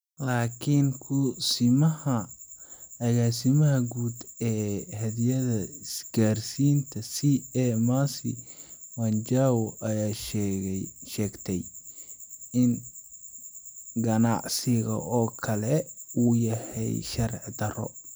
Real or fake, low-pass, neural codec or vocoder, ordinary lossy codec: real; none; none; none